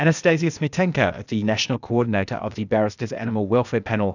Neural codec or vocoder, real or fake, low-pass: codec, 16 kHz, 0.8 kbps, ZipCodec; fake; 7.2 kHz